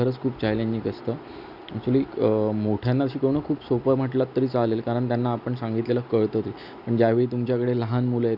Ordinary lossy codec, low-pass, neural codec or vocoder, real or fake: none; 5.4 kHz; none; real